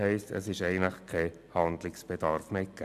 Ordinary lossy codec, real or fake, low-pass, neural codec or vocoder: AAC, 96 kbps; real; 14.4 kHz; none